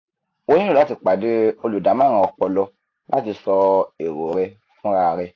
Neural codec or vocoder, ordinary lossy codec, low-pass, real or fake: none; AAC, 32 kbps; 7.2 kHz; real